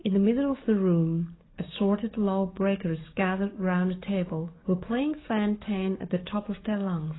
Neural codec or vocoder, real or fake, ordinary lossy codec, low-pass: codec, 44.1 kHz, 7.8 kbps, DAC; fake; AAC, 16 kbps; 7.2 kHz